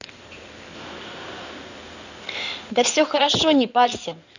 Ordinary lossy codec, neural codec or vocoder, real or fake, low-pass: none; codec, 16 kHz in and 24 kHz out, 2.2 kbps, FireRedTTS-2 codec; fake; 7.2 kHz